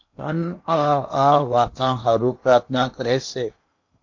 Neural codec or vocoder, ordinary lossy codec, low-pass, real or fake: codec, 16 kHz in and 24 kHz out, 0.8 kbps, FocalCodec, streaming, 65536 codes; MP3, 48 kbps; 7.2 kHz; fake